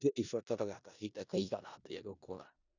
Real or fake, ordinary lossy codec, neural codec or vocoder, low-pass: fake; none; codec, 16 kHz in and 24 kHz out, 0.4 kbps, LongCat-Audio-Codec, four codebook decoder; 7.2 kHz